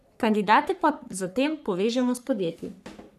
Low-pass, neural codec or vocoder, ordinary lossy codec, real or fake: 14.4 kHz; codec, 44.1 kHz, 3.4 kbps, Pupu-Codec; none; fake